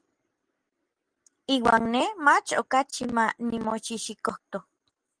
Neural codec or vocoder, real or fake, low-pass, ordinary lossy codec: none; real; 9.9 kHz; Opus, 24 kbps